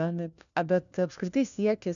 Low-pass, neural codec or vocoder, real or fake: 7.2 kHz; codec, 16 kHz, 1 kbps, FunCodec, trained on LibriTTS, 50 frames a second; fake